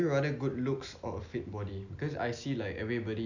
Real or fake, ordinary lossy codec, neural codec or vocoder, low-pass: real; none; none; 7.2 kHz